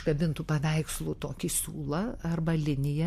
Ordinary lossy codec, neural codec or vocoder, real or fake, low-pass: MP3, 64 kbps; none; real; 14.4 kHz